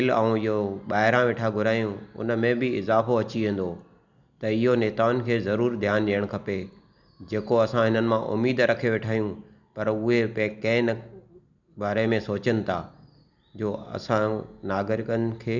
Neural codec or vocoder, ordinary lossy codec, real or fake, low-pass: none; none; real; 7.2 kHz